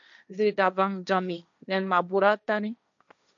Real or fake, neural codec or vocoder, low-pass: fake; codec, 16 kHz, 1.1 kbps, Voila-Tokenizer; 7.2 kHz